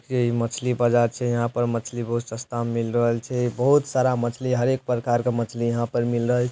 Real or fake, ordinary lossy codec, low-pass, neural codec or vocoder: real; none; none; none